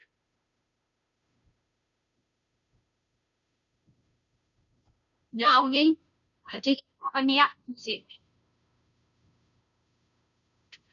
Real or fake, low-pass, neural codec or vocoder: fake; 7.2 kHz; codec, 16 kHz, 0.5 kbps, FunCodec, trained on Chinese and English, 25 frames a second